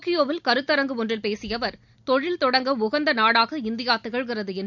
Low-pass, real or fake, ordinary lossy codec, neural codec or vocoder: 7.2 kHz; real; none; none